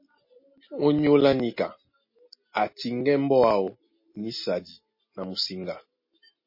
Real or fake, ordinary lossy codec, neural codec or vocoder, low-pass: real; MP3, 24 kbps; none; 5.4 kHz